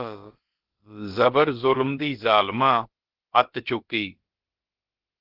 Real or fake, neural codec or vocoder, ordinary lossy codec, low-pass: fake; codec, 16 kHz, about 1 kbps, DyCAST, with the encoder's durations; Opus, 16 kbps; 5.4 kHz